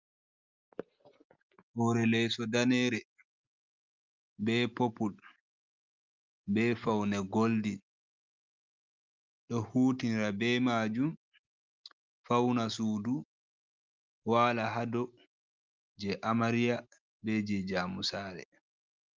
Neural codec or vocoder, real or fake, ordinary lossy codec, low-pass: none; real; Opus, 24 kbps; 7.2 kHz